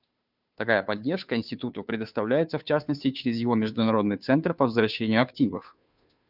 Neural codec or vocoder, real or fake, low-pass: codec, 16 kHz, 2 kbps, FunCodec, trained on Chinese and English, 25 frames a second; fake; 5.4 kHz